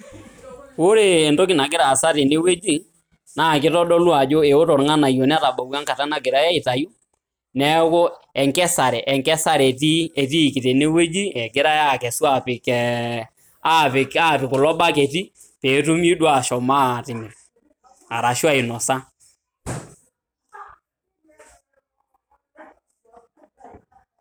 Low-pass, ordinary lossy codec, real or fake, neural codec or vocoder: none; none; real; none